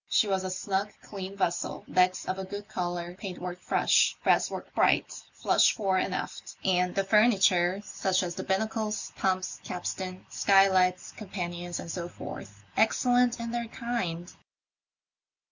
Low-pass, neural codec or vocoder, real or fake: 7.2 kHz; none; real